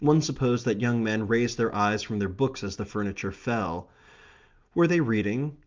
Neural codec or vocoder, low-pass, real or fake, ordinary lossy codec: none; 7.2 kHz; real; Opus, 24 kbps